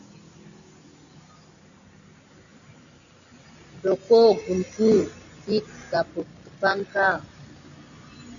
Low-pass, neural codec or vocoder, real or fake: 7.2 kHz; none; real